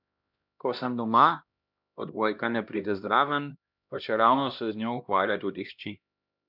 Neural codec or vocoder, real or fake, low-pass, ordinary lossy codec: codec, 16 kHz, 1 kbps, X-Codec, HuBERT features, trained on LibriSpeech; fake; 5.4 kHz; none